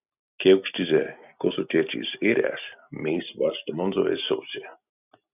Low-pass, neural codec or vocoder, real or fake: 3.6 kHz; none; real